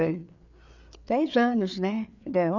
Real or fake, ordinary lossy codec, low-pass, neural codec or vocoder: fake; none; 7.2 kHz; codec, 16 kHz, 4 kbps, FreqCodec, larger model